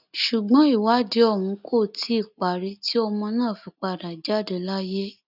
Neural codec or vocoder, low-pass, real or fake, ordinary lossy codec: none; 5.4 kHz; real; none